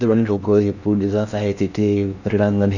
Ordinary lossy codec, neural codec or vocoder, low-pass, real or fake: none; codec, 16 kHz in and 24 kHz out, 0.6 kbps, FocalCodec, streaming, 4096 codes; 7.2 kHz; fake